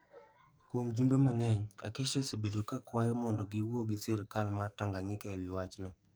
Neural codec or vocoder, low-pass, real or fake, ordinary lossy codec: codec, 44.1 kHz, 2.6 kbps, SNAC; none; fake; none